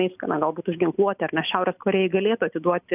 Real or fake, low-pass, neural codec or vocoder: real; 3.6 kHz; none